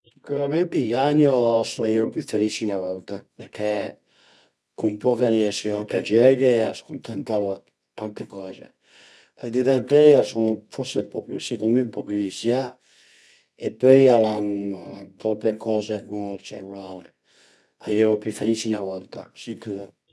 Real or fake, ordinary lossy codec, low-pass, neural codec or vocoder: fake; none; none; codec, 24 kHz, 0.9 kbps, WavTokenizer, medium music audio release